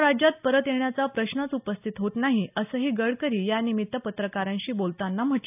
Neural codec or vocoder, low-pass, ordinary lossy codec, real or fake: none; 3.6 kHz; none; real